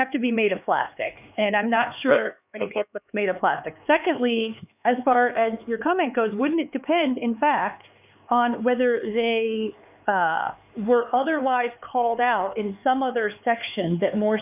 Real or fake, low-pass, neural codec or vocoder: fake; 3.6 kHz; codec, 16 kHz, 4 kbps, X-Codec, HuBERT features, trained on LibriSpeech